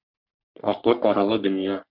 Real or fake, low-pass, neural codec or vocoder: fake; 5.4 kHz; codec, 44.1 kHz, 3.4 kbps, Pupu-Codec